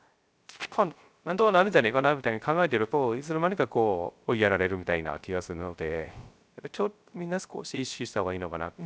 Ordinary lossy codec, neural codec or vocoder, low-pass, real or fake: none; codec, 16 kHz, 0.3 kbps, FocalCodec; none; fake